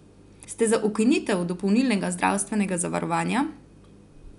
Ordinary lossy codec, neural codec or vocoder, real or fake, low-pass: none; none; real; 10.8 kHz